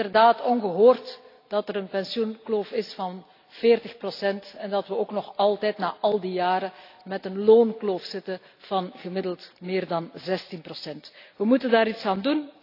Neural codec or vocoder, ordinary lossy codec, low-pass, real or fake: none; AAC, 32 kbps; 5.4 kHz; real